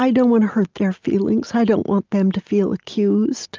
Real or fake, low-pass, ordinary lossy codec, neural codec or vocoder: real; 7.2 kHz; Opus, 24 kbps; none